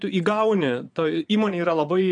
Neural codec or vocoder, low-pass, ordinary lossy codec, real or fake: vocoder, 22.05 kHz, 80 mel bands, Vocos; 9.9 kHz; MP3, 96 kbps; fake